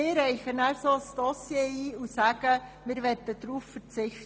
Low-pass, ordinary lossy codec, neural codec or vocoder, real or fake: none; none; none; real